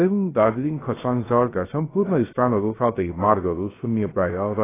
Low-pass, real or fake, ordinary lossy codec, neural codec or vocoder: 3.6 kHz; fake; AAC, 16 kbps; codec, 16 kHz, 0.3 kbps, FocalCodec